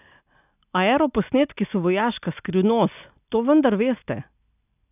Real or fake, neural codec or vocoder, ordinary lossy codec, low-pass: real; none; none; 3.6 kHz